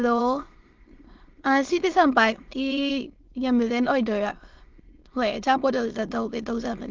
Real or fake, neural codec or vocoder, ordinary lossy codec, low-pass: fake; autoencoder, 22.05 kHz, a latent of 192 numbers a frame, VITS, trained on many speakers; Opus, 16 kbps; 7.2 kHz